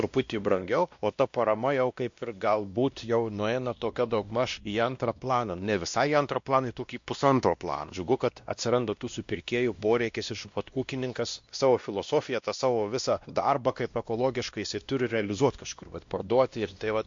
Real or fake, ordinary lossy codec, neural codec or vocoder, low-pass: fake; MP3, 48 kbps; codec, 16 kHz, 1 kbps, X-Codec, WavLM features, trained on Multilingual LibriSpeech; 7.2 kHz